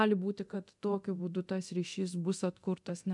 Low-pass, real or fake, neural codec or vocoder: 10.8 kHz; fake; codec, 24 kHz, 0.9 kbps, DualCodec